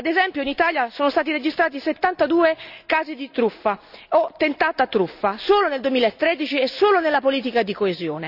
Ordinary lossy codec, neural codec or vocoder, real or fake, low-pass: none; none; real; 5.4 kHz